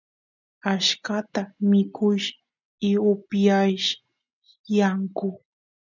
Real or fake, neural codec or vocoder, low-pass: real; none; 7.2 kHz